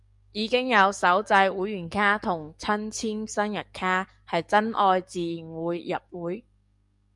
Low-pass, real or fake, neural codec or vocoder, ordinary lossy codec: 10.8 kHz; fake; codec, 44.1 kHz, 7.8 kbps, DAC; MP3, 96 kbps